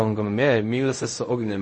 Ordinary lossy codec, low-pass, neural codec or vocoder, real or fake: MP3, 32 kbps; 10.8 kHz; codec, 16 kHz in and 24 kHz out, 0.4 kbps, LongCat-Audio-Codec, fine tuned four codebook decoder; fake